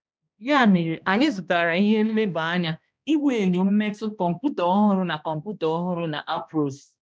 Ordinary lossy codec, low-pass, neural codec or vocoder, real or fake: none; none; codec, 16 kHz, 1 kbps, X-Codec, HuBERT features, trained on balanced general audio; fake